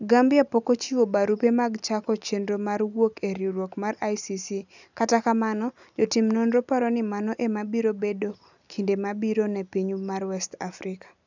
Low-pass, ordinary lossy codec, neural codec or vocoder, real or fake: 7.2 kHz; none; none; real